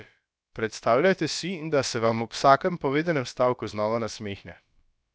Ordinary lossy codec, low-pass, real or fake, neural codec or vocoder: none; none; fake; codec, 16 kHz, about 1 kbps, DyCAST, with the encoder's durations